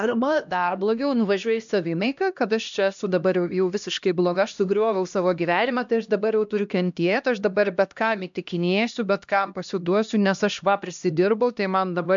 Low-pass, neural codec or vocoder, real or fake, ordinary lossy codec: 7.2 kHz; codec, 16 kHz, 1 kbps, X-Codec, HuBERT features, trained on LibriSpeech; fake; MP3, 64 kbps